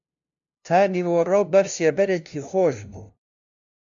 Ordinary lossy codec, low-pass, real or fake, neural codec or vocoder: AAC, 64 kbps; 7.2 kHz; fake; codec, 16 kHz, 0.5 kbps, FunCodec, trained on LibriTTS, 25 frames a second